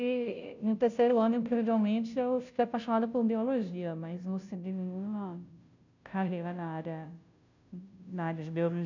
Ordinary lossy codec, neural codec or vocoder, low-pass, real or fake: none; codec, 16 kHz, 0.5 kbps, FunCodec, trained on Chinese and English, 25 frames a second; 7.2 kHz; fake